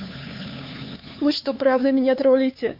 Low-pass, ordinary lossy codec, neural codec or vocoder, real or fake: 5.4 kHz; MP3, 32 kbps; codec, 16 kHz, 4 kbps, X-Codec, HuBERT features, trained on LibriSpeech; fake